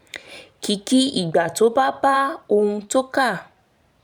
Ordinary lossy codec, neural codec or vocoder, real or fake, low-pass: none; vocoder, 44.1 kHz, 128 mel bands every 512 samples, BigVGAN v2; fake; 19.8 kHz